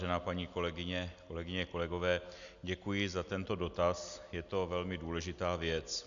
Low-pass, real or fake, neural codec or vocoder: 7.2 kHz; real; none